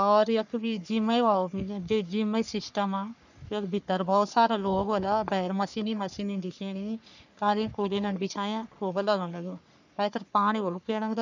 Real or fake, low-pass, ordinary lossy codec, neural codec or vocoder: fake; 7.2 kHz; none; codec, 44.1 kHz, 3.4 kbps, Pupu-Codec